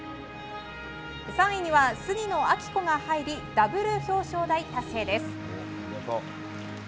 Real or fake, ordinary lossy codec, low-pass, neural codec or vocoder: real; none; none; none